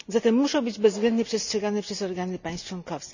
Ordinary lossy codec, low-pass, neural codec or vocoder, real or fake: none; 7.2 kHz; none; real